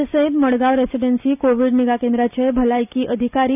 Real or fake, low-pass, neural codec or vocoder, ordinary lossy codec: real; 3.6 kHz; none; none